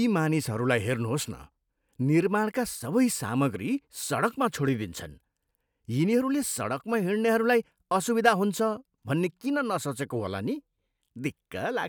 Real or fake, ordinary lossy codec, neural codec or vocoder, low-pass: real; none; none; none